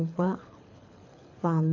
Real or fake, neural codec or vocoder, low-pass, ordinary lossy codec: fake; codec, 24 kHz, 6 kbps, HILCodec; 7.2 kHz; AAC, 48 kbps